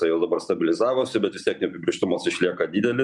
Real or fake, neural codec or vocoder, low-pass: real; none; 10.8 kHz